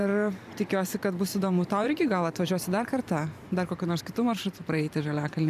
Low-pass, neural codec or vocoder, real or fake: 14.4 kHz; vocoder, 44.1 kHz, 128 mel bands every 512 samples, BigVGAN v2; fake